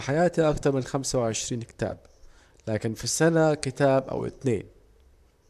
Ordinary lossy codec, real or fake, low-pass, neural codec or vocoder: AAC, 96 kbps; fake; 14.4 kHz; vocoder, 44.1 kHz, 128 mel bands, Pupu-Vocoder